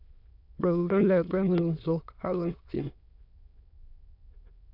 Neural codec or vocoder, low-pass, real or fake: autoencoder, 22.05 kHz, a latent of 192 numbers a frame, VITS, trained on many speakers; 5.4 kHz; fake